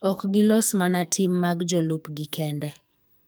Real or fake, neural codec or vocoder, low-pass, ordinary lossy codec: fake; codec, 44.1 kHz, 2.6 kbps, SNAC; none; none